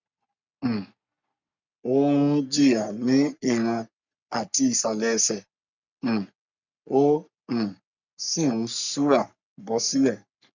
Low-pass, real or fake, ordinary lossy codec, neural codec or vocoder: 7.2 kHz; fake; none; codec, 44.1 kHz, 3.4 kbps, Pupu-Codec